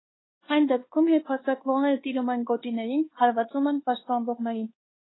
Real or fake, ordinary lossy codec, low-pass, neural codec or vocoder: fake; AAC, 16 kbps; 7.2 kHz; codec, 24 kHz, 1.2 kbps, DualCodec